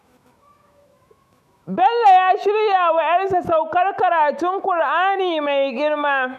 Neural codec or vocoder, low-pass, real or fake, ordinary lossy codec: autoencoder, 48 kHz, 128 numbers a frame, DAC-VAE, trained on Japanese speech; 14.4 kHz; fake; none